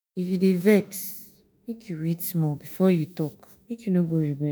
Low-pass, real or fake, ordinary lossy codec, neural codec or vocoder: none; fake; none; autoencoder, 48 kHz, 32 numbers a frame, DAC-VAE, trained on Japanese speech